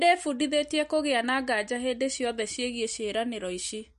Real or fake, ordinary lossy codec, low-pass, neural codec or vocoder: real; MP3, 48 kbps; 14.4 kHz; none